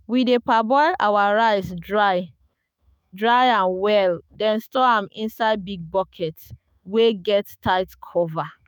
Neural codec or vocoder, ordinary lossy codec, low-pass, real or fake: autoencoder, 48 kHz, 128 numbers a frame, DAC-VAE, trained on Japanese speech; none; none; fake